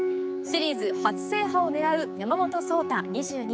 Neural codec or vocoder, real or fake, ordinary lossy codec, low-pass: codec, 16 kHz, 4 kbps, X-Codec, HuBERT features, trained on general audio; fake; none; none